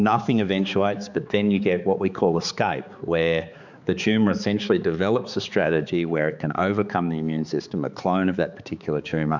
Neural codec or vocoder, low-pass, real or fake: codec, 16 kHz, 4 kbps, X-Codec, HuBERT features, trained on balanced general audio; 7.2 kHz; fake